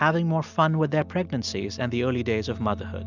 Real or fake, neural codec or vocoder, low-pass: real; none; 7.2 kHz